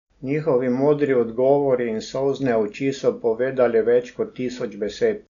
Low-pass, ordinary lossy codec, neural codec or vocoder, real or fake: 7.2 kHz; none; none; real